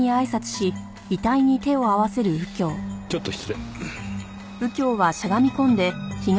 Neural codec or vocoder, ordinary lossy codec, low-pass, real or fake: none; none; none; real